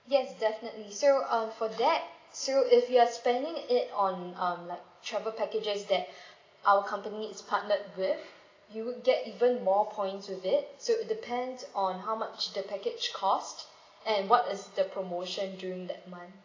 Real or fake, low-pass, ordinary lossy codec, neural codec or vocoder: real; 7.2 kHz; AAC, 32 kbps; none